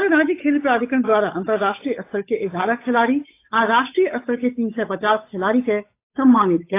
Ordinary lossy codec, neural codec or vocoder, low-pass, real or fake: AAC, 24 kbps; codec, 16 kHz, 8 kbps, FunCodec, trained on Chinese and English, 25 frames a second; 3.6 kHz; fake